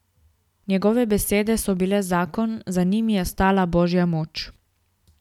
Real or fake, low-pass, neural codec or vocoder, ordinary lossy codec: real; 19.8 kHz; none; none